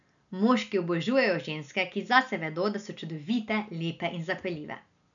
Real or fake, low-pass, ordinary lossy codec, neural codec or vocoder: real; 7.2 kHz; none; none